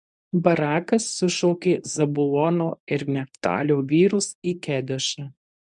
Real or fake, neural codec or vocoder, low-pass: fake; codec, 24 kHz, 0.9 kbps, WavTokenizer, medium speech release version 1; 10.8 kHz